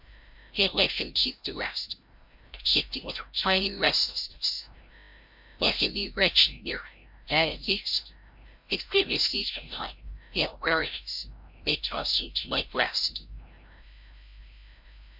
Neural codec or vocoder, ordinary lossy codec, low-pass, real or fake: codec, 16 kHz, 0.5 kbps, FreqCodec, larger model; MP3, 48 kbps; 5.4 kHz; fake